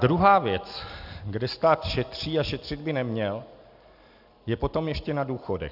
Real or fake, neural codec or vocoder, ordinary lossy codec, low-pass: real; none; AAC, 48 kbps; 5.4 kHz